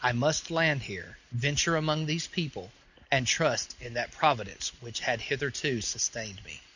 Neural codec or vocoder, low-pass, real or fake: none; 7.2 kHz; real